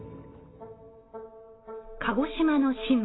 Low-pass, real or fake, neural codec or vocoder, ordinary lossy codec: 7.2 kHz; real; none; AAC, 16 kbps